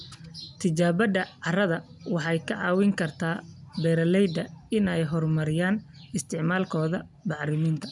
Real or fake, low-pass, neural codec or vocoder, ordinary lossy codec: real; 10.8 kHz; none; none